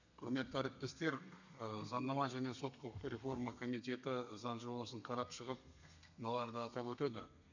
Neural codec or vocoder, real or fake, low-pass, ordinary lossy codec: codec, 32 kHz, 1.9 kbps, SNAC; fake; 7.2 kHz; none